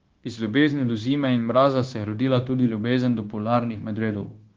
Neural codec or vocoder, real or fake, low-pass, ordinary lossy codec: codec, 16 kHz, 0.9 kbps, LongCat-Audio-Codec; fake; 7.2 kHz; Opus, 16 kbps